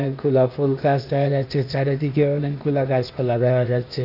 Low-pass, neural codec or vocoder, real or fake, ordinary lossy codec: 5.4 kHz; codec, 16 kHz, 0.8 kbps, ZipCodec; fake; none